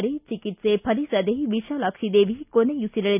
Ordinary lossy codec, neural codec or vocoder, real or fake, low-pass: none; none; real; 3.6 kHz